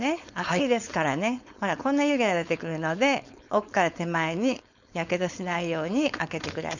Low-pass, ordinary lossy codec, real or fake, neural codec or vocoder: 7.2 kHz; none; fake; codec, 16 kHz, 4.8 kbps, FACodec